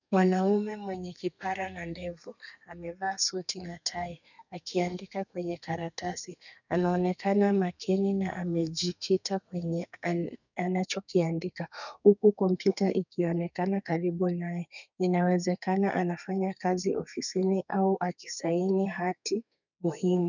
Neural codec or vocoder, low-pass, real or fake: codec, 32 kHz, 1.9 kbps, SNAC; 7.2 kHz; fake